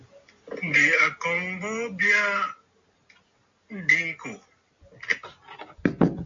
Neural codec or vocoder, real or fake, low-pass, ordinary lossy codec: none; real; 7.2 kHz; MP3, 48 kbps